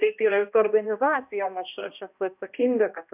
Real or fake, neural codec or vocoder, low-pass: fake; codec, 16 kHz, 1 kbps, X-Codec, HuBERT features, trained on balanced general audio; 3.6 kHz